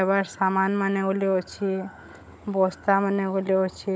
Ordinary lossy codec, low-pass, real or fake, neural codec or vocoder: none; none; fake; codec, 16 kHz, 16 kbps, FunCodec, trained on Chinese and English, 50 frames a second